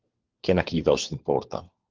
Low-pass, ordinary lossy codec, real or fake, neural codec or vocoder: 7.2 kHz; Opus, 16 kbps; fake; codec, 16 kHz, 4 kbps, FunCodec, trained on LibriTTS, 50 frames a second